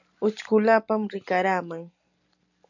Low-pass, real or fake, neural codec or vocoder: 7.2 kHz; real; none